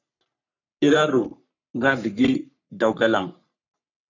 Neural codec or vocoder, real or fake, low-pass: codec, 44.1 kHz, 3.4 kbps, Pupu-Codec; fake; 7.2 kHz